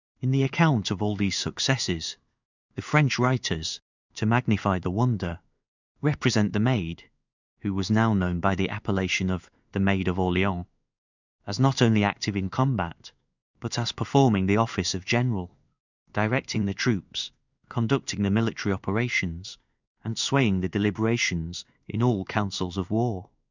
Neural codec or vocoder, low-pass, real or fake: codec, 16 kHz in and 24 kHz out, 1 kbps, XY-Tokenizer; 7.2 kHz; fake